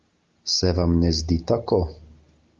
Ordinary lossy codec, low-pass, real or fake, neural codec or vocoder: Opus, 32 kbps; 7.2 kHz; real; none